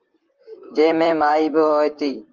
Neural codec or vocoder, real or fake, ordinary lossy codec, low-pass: vocoder, 44.1 kHz, 128 mel bands, Pupu-Vocoder; fake; Opus, 32 kbps; 7.2 kHz